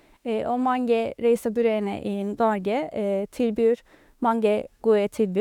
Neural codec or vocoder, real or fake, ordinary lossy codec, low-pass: autoencoder, 48 kHz, 32 numbers a frame, DAC-VAE, trained on Japanese speech; fake; none; 19.8 kHz